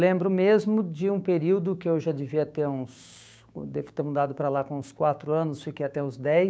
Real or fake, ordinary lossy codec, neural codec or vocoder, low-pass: fake; none; codec, 16 kHz, 6 kbps, DAC; none